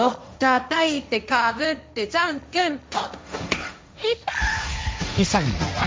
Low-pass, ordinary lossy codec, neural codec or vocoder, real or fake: none; none; codec, 16 kHz, 1.1 kbps, Voila-Tokenizer; fake